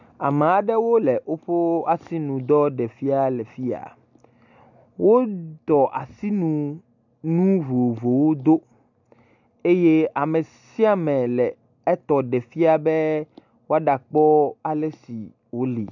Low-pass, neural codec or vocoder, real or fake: 7.2 kHz; none; real